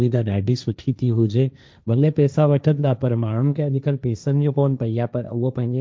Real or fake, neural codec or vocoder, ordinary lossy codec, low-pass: fake; codec, 16 kHz, 1.1 kbps, Voila-Tokenizer; none; none